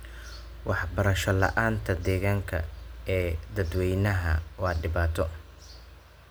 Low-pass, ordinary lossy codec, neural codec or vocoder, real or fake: none; none; none; real